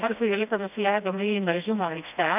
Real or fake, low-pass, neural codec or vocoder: fake; 3.6 kHz; codec, 16 kHz, 1 kbps, FreqCodec, smaller model